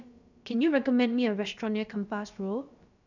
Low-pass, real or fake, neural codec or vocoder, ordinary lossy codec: 7.2 kHz; fake; codec, 16 kHz, about 1 kbps, DyCAST, with the encoder's durations; none